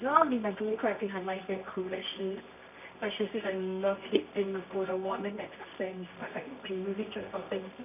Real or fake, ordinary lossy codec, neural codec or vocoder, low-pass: fake; none; codec, 24 kHz, 0.9 kbps, WavTokenizer, medium music audio release; 3.6 kHz